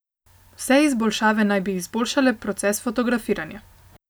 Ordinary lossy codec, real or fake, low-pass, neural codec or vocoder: none; real; none; none